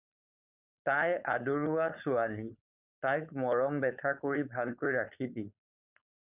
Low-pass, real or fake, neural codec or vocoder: 3.6 kHz; fake; codec, 16 kHz, 4.8 kbps, FACodec